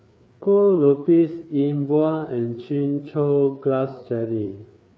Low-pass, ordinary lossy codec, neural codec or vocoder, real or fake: none; none; codec, 16 kHz, 4 kbps, FreqCodec, larger model; fake